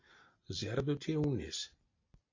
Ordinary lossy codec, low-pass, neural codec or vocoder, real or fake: AAC, 32 kbps; 7.2 kHz; none; real